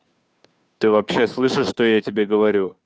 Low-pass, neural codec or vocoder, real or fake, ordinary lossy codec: none; codec, 16 kHz, 2 kbps, FunCodec, trained on Chinese and English, 25 frames a second; fake; none